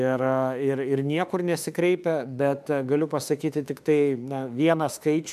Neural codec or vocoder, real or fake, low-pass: autoencoder, 48 kHz, 32 numbers a frame, DAC-VAE, trained on Japanese speech; fake; 14.4 kHz